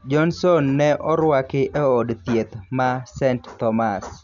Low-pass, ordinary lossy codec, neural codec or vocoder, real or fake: 7.2 kHz; none; none; real